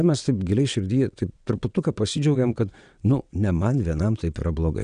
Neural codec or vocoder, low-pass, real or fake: vocoder, 22.05 kHz, 80 mel bands, WaveNeXt; 9.9 kHz; fake